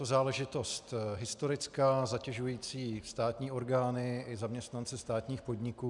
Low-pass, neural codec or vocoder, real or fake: 10.8 kHz; none; real